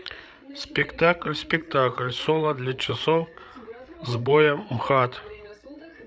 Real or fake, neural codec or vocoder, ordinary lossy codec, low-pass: fake; codec, 16 kHz, 8 kbps, FreqCodec, larger model; none; none